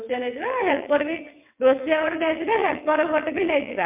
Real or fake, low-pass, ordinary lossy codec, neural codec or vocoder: fake; 3.6 kHz; MP3, 32 kbps; vocoder, 22.05 kHz, 80 mel bands, WaveNeXt